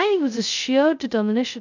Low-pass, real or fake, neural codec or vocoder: 7.2 kHz; fake; codec, 16 kHz, 0.2 kbps, FocalCodec